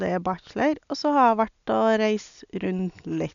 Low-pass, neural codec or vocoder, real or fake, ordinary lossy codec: 7.2 kHz; none; real; none